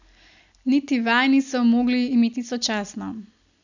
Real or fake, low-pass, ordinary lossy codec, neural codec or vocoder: real; 7.2 kHz; none; none